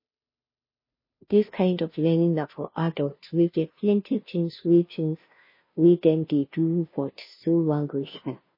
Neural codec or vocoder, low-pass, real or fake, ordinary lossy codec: codec, 16 kHz, 0.5 kbps, FunCodec, trained on Chinese and English, 25 frames a second; 5.4 kHz; fake; MP3, 24 kbps